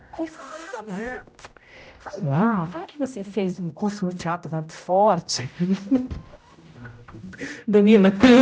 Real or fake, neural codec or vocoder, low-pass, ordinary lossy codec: fake; codec, 16 kHz, 0.5 kbps, X-Codec, HuBERT features, trained on general audio; none; none